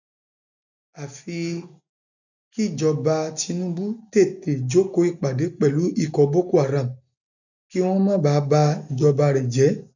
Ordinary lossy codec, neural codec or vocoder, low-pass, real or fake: none; none; 7.2 kHz; real